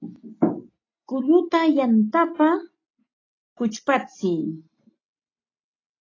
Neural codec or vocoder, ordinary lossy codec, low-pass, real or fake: none; AAC, 32 kbps; 7.2 kHz; real